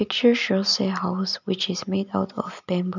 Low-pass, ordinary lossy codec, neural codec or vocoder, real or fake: 7.2 kHz; none; none; real